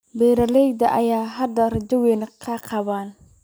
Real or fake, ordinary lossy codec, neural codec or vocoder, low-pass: real; none; none; none